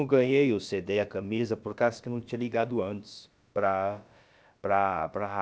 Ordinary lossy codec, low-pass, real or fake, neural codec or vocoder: none; none; fake; codec, 16 kHz, about 1 kbps, DyCAST, with the encoder's durations